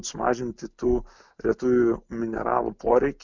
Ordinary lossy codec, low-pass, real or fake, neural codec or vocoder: MP3, 64 kbps; 7.2 kHz; real; none